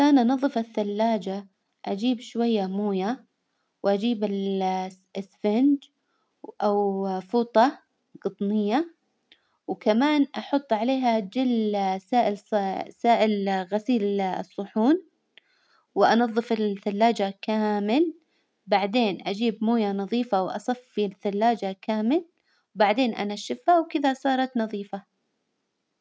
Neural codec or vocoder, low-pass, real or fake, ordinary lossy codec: none; none; real; none